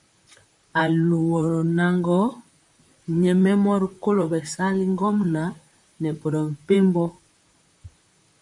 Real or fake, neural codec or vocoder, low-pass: fake; vocoder, 44.1 kHz, 128 mel bands, Pupu-Vocoder; 10.8 kHz